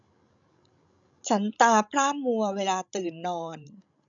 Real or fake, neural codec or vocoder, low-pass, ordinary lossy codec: fake; codec, 16 kHz, 16 kbps, FreqCodec, larger model; 7.2 kHz; none